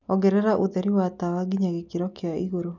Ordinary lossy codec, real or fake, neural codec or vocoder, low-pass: none; real; none; 7.2 kHz